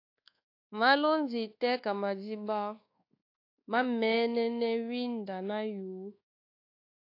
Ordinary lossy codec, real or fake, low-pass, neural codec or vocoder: AAC, 32 kbps; fake; 5.4 kHz; codec, 24 kHz, 1.2 kbps, DualCodec